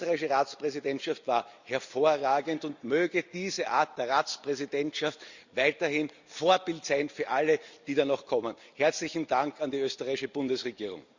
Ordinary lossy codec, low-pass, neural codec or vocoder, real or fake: Opus, 64 kbps; 7.2 kHz; none; real